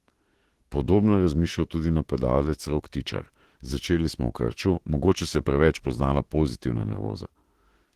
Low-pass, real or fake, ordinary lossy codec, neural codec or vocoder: 14.4 kHz; fake; Opus, 16 kbps; autoencoder, 48 kHz, 32 numbers a frame, DAC-VAE, trained on Japanese speech